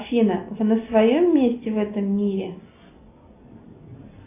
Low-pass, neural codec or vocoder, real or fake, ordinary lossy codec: 3.6 kHz; none; real; AAC, 24 kbps